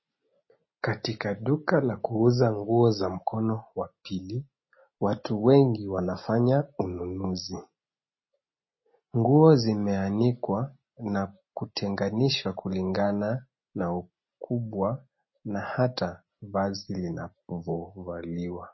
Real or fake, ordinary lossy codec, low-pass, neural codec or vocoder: real; MP3, 24 kbps; 7.2 kHz; none